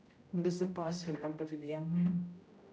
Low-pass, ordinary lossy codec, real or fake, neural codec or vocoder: none; none; fake; codec, 16 kHz, 0.5 kbps, X-Codec, HuBERT features, trained on general audio